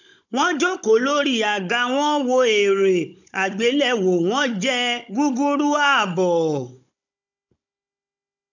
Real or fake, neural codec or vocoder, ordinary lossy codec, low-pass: fake; codec, 16 kHz, 16 kbps, FunCodec, trained on Chinese and English, 50 frames a second; none; 7.2 kHz